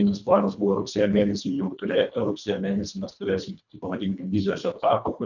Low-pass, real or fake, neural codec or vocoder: 7.2 kHz; fake; codec, 24 kHz, 1.5 kbps, HILCodec